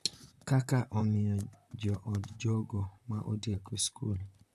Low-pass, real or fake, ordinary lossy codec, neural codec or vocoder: 14.4 kHz; fake; none; vocoder, 44.1 kHz, 128 mel bands, Pupu-Vocoder